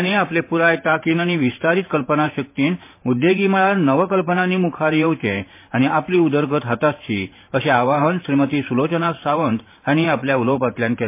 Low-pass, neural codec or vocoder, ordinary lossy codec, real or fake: 3.6 kHz; vocoder, 44.1 kHz, 128 mel bands every 512 samples, BigVGAN v2; MP3, 24 kbps; fake